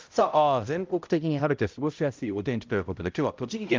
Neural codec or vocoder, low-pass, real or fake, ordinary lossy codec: codec, 16 kHz, 0.5 kbps, X-Codec, HuBERT features, trained on balanced general audio; 7.2 kHz; fake; Opus, 24 kbps